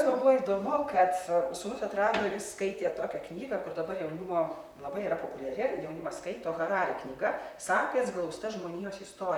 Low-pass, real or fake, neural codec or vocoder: 19.8 kHz; fake; vocoder, 44.1 kHz, 128 mel bands, Pupu-Vocoder